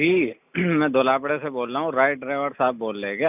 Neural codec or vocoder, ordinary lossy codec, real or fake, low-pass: none; none; real; 3.6 kHz